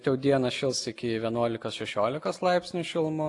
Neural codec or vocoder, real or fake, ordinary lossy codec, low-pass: none; real; AAC, 48 kbps; 10.8 kHz